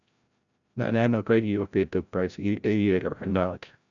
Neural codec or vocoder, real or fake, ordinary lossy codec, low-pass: codec, 16 kHz, 0.5 kbps, FreqCodec, larger model; fake; none; 7.2 kHz